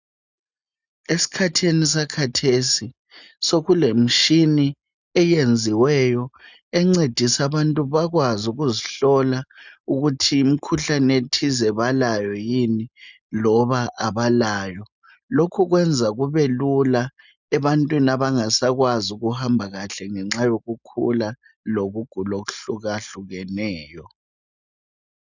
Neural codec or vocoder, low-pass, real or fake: none; 7.2 kHz; real